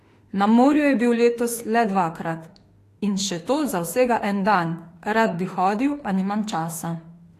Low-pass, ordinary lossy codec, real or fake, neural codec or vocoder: 14.4 kHz; AAC, 48 kbps; fake; autoencoder, 48 kHz, 32 numbers a frame, DAC-VAE, trained on Japanese speech